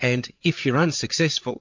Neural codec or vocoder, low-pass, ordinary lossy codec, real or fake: codec, 16 kHz, 8 kbps, FreqCodec, larger model; 7.2 kHz; MP3, 48 kbps; fake